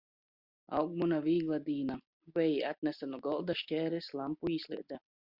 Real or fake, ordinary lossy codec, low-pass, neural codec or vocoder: real; Opus, 64 kbps; 5.4 kHz; none